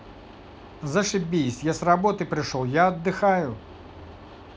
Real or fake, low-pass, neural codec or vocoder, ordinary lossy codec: real; none; none; none